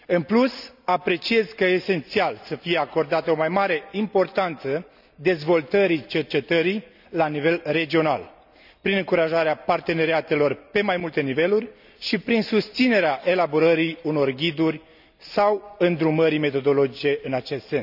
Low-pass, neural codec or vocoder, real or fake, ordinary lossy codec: 5.4 kHz; none; real; none